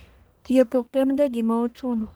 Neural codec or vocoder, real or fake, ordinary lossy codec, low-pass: codec, 44.1 kHz, 1.7 kbps, Pupu-Codec; fake; none; none